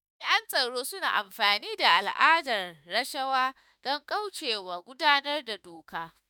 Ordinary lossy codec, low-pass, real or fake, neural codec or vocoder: none; none; fake; autoencoder, 48 kHz, 32 numbers a frame, DAC-VAE, trained on Japanese speech